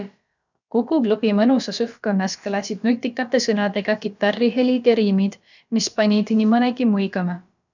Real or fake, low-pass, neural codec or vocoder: fake; 7.2 kHz; codec, 16 kHz, about 1 kbps, DyCAST, with the encoder's durations